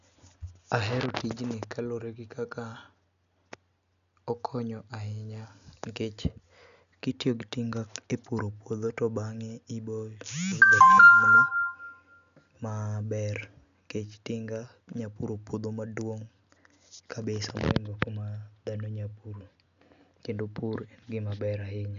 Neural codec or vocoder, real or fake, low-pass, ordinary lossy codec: none; real; 7.2 kHz; none